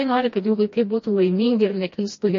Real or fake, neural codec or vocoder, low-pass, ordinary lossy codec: fake; codec, 16 kHz, 1 kbps, FreqCodec, smaller model; 7.2 kHz; MP3, 32 kbps